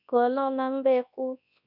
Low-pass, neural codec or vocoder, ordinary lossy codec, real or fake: 5.4 kHz; codec, 24 kHz, 0.9 kbps, WavTokenizer, large speech release; none; fake